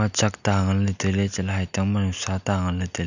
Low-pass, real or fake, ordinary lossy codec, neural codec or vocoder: 7.2 kHz; real; none; none